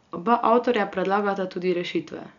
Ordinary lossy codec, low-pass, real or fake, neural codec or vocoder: none; 7.2 kHz; real; none